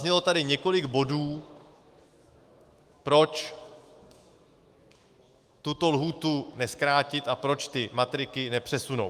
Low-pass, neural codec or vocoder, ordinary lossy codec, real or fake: 14.4 kHz; autoencoder, 48 kHz, 128 numbers a frame, DAC-VAE, trained on Japanese speech; Opus, 32 kbps; fake